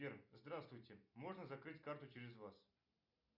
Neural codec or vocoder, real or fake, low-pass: none; real; 5.4 kHz